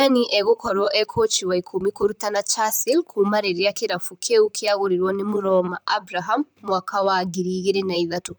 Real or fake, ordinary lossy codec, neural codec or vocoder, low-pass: fake; none; vocoder, 44.1 kHz, 128 mel bands, Pupu-Vocoder; none